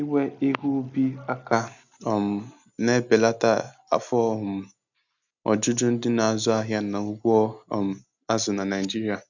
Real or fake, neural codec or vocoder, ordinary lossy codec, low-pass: real; none; none; 7.2 kHz